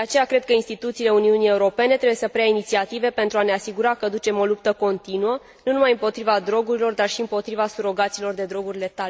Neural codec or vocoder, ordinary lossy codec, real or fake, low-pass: none; none; real; none